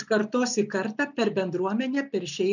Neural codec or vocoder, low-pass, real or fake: none; 7.2 kHz; real